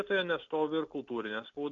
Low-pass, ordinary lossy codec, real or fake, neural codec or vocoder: 7.2 kHz; MP3, 48 kbps; real; none